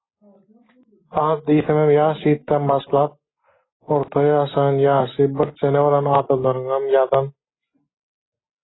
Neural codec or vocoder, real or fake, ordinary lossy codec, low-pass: none; real; AAC, 16 kbps; 7.2 kHz